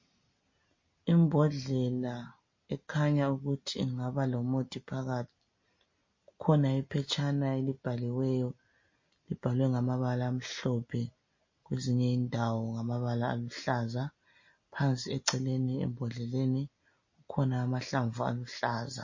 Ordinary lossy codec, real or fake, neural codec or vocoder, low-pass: MP3, 32 kbps; real; none; 7.2 kHz